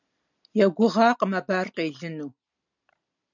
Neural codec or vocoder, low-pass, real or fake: none; 7.2 kHz; real